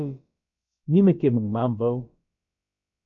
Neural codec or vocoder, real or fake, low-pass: codec, 16 kHz, about 1 kbps, DyCAST, with the encoder's durations; fake; 7.2 kHz